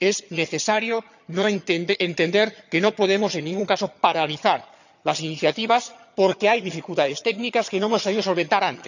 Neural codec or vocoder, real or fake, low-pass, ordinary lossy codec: vocoder, 22.05 kHz, 80 mel bands, HiFi-GAN; fake; 7.2 kHz; none